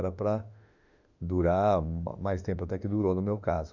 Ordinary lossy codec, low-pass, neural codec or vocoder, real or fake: none; 7.2 kHz; autoencoder, 48 kHz, 32 numbers a frame, DAC-VAE, trained on Japanese speech; fake